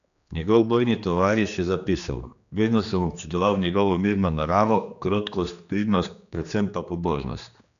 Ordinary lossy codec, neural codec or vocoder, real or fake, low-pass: none; codec, 16 kHz, 2 kbps, X-Codec, HuBERT features, trained on general audio; fake; 7.2 kHz